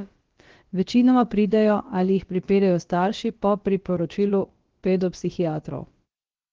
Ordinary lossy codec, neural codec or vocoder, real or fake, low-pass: Opus, 16 kbps; codec, 16 kHz, about 1 kbps, DyCAST, with the encoder's durations; fake; 7.2 kHz